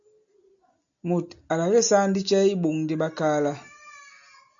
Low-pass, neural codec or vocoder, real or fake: 7.2 kHz; none; real